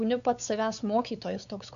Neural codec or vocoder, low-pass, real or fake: codec, 16 kHz, 4 kbps, X-Codec, WavLM features, trained on Multilingual LibriSpeech; 7.2 kHz; fake